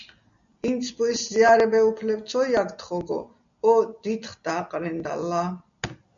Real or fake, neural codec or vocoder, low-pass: real; none; 7.2 kHz